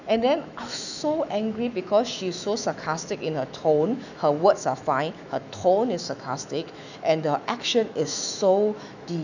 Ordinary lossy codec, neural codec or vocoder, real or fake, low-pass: none; autoencoder, 48 kHz, 128 numbers a frame, DAC-VAE, trained on Japanese speech; fake; 7.2 kHz